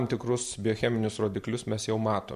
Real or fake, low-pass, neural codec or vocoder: real; 14.4 kHz; none